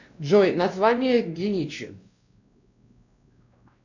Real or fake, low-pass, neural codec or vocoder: fake; 7.2 kHz; codec, 16 kHz, 1 kbps, X-Codec, WavLM features, trained on Multilingual LibriSpeech